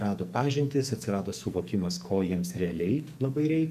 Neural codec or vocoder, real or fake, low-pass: codec, 44.1 kHz, 2.6 kbps, SNAC; fake; 14.4 kHz